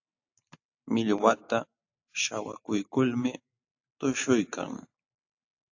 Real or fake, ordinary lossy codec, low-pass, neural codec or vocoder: real; AAC, 48 kbps; 7.2 kHz; none